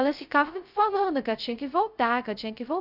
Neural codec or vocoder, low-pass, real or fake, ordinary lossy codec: codec, 16 kHz, 0.2 kbps, FocalCodec; 5.4 kHz; fake; none